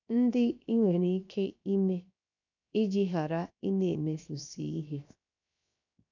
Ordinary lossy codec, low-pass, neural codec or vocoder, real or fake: none; 7.2 kHz; codec, 16 kHz, 0.7 kbps, FocalCodec; fake